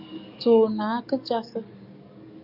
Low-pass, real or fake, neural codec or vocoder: 5.4 kHz; fake; codec, 44.1 kHz, 7.8 kbps, DAC